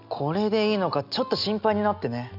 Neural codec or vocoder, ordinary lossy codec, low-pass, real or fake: none; none; 5.4 kHz; real